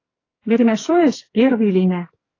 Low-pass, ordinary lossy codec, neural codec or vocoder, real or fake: 7.2 kHz; AAC, 32 kbps; codec, 44.1 kHz, 2.6 kbps, SNAC; fake